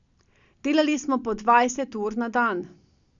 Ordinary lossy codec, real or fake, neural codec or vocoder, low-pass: none; real; none; 7.2 kHz